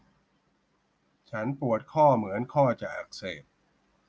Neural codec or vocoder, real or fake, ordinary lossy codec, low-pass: none; real; none; none